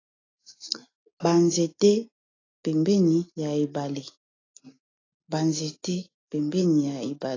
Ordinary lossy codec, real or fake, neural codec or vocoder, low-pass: AAC, 32 kbps; real; none; 7.2 kHz